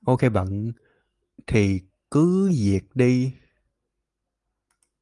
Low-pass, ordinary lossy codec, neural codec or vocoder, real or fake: 10.8 kHz; Opus, 32 kbps; none; real